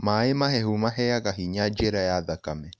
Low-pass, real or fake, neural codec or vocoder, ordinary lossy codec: none; real; none; none